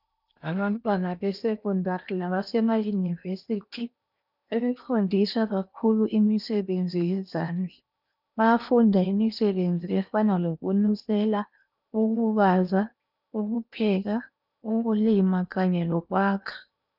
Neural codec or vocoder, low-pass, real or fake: codec, 16 kHz in and 24 kHz out, 0.8 kbps, FocalCodec, streaming, 65536 codes; 5.4 kHz; fake